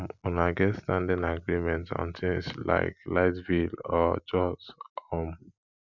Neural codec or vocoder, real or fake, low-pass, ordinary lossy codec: none; real; 7.2 kHz; none